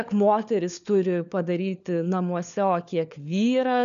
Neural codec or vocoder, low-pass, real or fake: codec, 16 kHz, 4 kbps, FunCodec, trained on LibriTTS, 50 frames a second; 7.2 kHz; fake